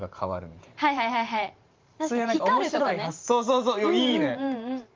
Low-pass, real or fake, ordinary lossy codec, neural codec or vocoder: 7.2 kHz; fake; Opus, 32 kbps; vocoder, 44.1 kHz, 128 mel bands every 512 samples, BigVGAN v2